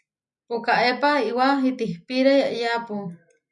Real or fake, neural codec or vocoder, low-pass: real; none; 10.8 kHz